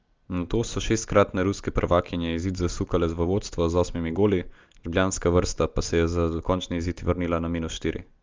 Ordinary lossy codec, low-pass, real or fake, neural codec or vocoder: Opus, 32 kbps; 7.2 kHz; real; none